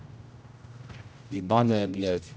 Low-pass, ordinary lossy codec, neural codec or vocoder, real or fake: none; none; codec, 16 kHz, 0.5 kbps, X-Codec, HuBERT features, trained on general audio; fake